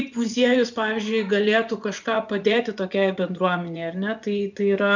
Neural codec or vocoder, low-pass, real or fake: vocoder, 24 kHz, 100 mel bands, Vocos; 7.2 kHz; fake